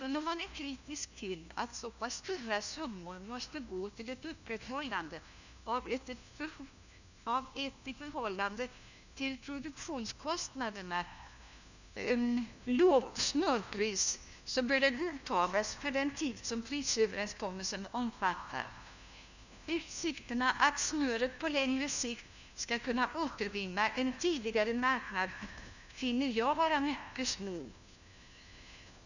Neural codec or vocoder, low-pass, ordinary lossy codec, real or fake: codec, 16 kHz, 1 kbps, FunCodec, trained on LibriTTS, 50 frames a second; 7.2 kHz; none; fake